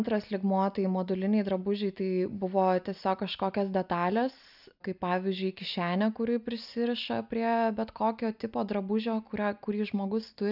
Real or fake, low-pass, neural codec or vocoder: real; 5.4 kHz; none